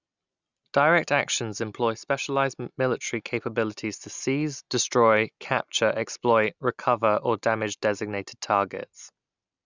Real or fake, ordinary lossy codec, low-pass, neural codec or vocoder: real; none; 7.2 kHz; none